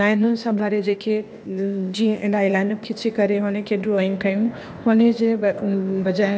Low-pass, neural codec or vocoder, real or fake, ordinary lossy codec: none; codec, 16 kHz, 0.8 kbps, ZipCodec; fake; none